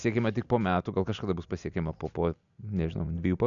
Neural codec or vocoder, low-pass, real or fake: none; 7.2 kHz; real